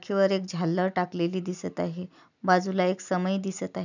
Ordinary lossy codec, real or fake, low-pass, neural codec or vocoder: none; real; 7.2 kHz; none